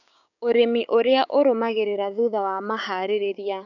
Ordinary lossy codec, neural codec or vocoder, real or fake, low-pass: none; codec, 16 kHz, 6 kbps, DAC; fake; 7.2 kHz